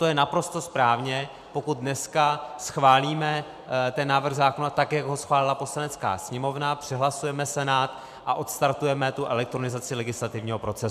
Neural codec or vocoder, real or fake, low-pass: vocoder, 44.1 kHz, 128 mel bands every 512 samples, BigVGAN v2; fake; 14.4 kHz